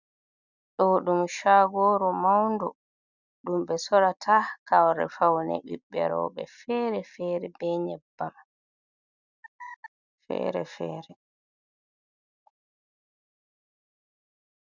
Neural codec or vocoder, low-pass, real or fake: none; 7.2 kHz; real